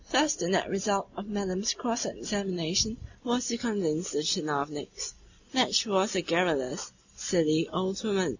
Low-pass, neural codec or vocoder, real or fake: 7.2 kHz; none; real